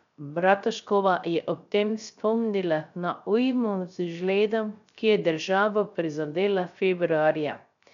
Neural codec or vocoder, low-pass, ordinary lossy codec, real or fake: codec, 16 kHz, 0.3 kbps, FocalCodec; 7.2 kHz; none; fake